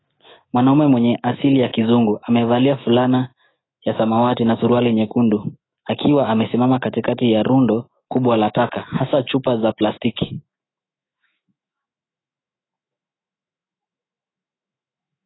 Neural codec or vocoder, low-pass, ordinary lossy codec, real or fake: autoencoder, 48 kHz, 128 numbers a frame, DAC-VAE, trained on Japanese speech; 7.2 kHz; AAC, 16 kbps; fake